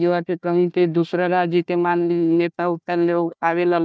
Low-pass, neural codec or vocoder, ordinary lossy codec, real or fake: none; codec, 16 kHz, 1 kbps, FunCodec, trained on Chinese and English, 50 frames a second; none; fake